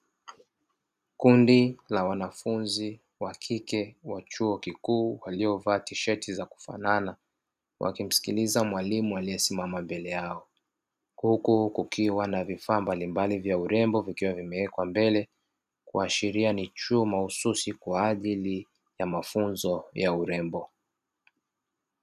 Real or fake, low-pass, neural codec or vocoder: real; 14.4 kHz; none